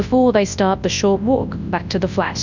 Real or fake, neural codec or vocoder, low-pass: fake; codec, 24 kHz, 0.9 kbps, WavTokenizer, large speech release; 7.2 kHz